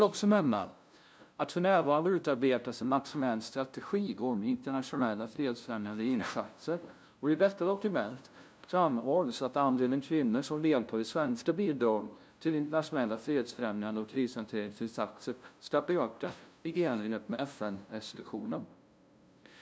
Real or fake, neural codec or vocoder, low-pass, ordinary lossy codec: fake; codec, 16 kHz, 0.5 kbps, FunCodec, trained on LibriTTS, 25 frames a second; none; none